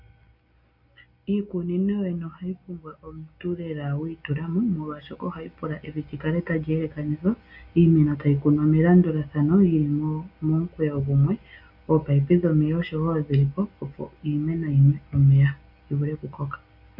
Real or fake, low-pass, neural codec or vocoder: real; 5.4 kHz; none